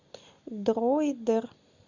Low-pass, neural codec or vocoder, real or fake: 7.2 kHz; none; real